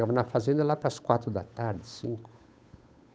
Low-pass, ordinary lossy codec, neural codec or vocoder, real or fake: none; none; codec, 16 kHz, 8 kbps, FunCodec, trained on Chinese and English, 25 frames a second; fake